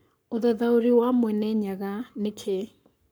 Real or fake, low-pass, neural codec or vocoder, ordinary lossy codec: fake; none; codec, 44.1 kHz, 7.8 kbps, Pupu-Codec; none